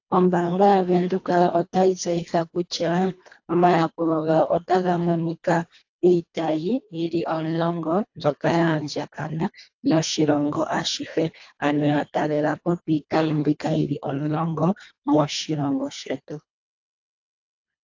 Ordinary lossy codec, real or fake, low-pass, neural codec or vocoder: AAC, 48 kbps; fake; 7.2 kHz; codec, 24 kHz, 1.5 kbps, HILCodec